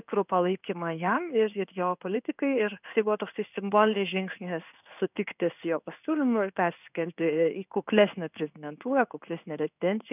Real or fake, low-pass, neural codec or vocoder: fake; 3.6 kHz; codec, 16 kHz in and 24 kHz out, 1 kbps, XY-Tokenizer